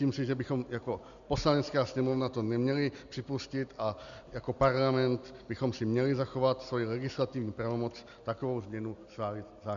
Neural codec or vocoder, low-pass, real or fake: none; 7.2 kHz; real